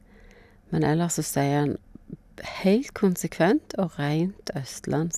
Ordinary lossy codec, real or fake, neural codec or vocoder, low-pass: none; real; none; 14.4 kHz